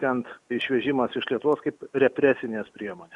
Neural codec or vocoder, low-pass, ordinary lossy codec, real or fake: none; 10.8 kHz; Opus, 64 kbps; real